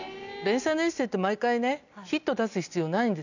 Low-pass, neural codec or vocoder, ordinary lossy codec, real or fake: 7.2 kHz; none; none; real